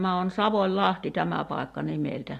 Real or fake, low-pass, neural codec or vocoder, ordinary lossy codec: real; 14.4 kHz; none; AAC, 64 kbps